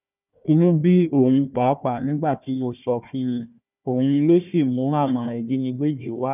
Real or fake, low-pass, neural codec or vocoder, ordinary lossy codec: fake; 3.6 kHz; codec, 16 kHz, 1 kbps, FunCodec, trained on Chinese and English, 50 frames a second; none